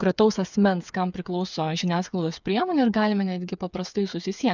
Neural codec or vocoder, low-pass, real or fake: codec, 16 kHz, 8 kbps, FreqCodec, smaller model; 7.2 kHz; fake